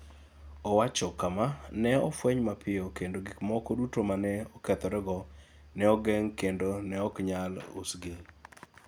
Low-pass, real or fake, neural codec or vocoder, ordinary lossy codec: none; real; none; none